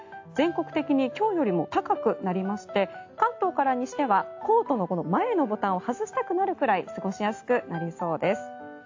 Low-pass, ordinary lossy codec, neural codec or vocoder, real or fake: 7.2 kHz; AAC, 48 kbps; none; real